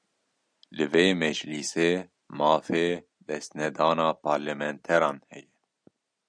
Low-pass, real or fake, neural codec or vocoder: 9.9 kHz; real; none